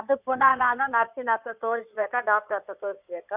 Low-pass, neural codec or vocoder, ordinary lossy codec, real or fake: 3.6 kHz; codec, 16 kHz in and 24 kHz out, 2.2 kbps, FireRedTTS-2 codec; none; fake